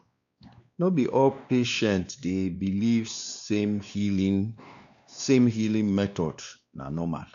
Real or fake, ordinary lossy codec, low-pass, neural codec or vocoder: fake; none; 7.2 kHz; codec, 16 kHz, 2 kbps, X-Codec, WavLM features, trained on Multilingual LibriSpeech